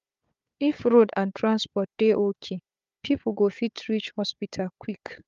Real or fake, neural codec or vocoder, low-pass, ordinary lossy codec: fake; codec, 16 kHz, 4 kbps, FunCodec, trained on Chinese and English, 50 frames a second; 7.2 kHz; Opus, 32 kbps